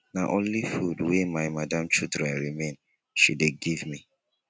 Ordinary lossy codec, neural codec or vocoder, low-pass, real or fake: none; none; none; real